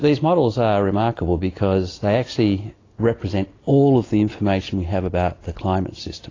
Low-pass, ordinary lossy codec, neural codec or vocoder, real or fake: 7.2 kHz; AAC, 32 kbps; none; real